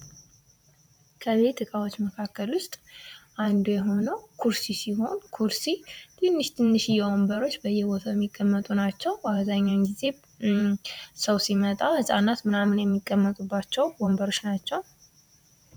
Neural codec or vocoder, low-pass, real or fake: vocoder, 44.1 kHz, 128 mel bands every 512 samples, BigVGAN v2; 19.8 kHz; fake